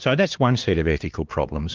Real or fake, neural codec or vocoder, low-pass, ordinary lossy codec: fake; codec, 16 kHz, 2 kbps, X-Codec, HuBERT features, trained on LibriSpeech; 7.2 kHz; Opus, 16 kbps